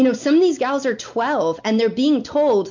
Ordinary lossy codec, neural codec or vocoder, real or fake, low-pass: MP3, 48 kbps; none; real; 7.2 kHz